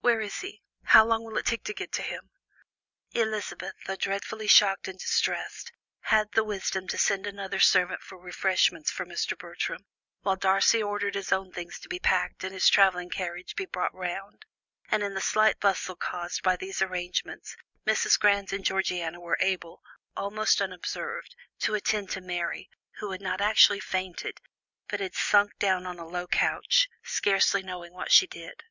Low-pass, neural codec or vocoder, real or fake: 7.2 kHz; none; real